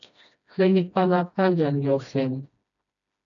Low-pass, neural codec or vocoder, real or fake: 7.2 kHz; codec, 16 kHz, 1 kbps, FreqCodec, smaller model; fake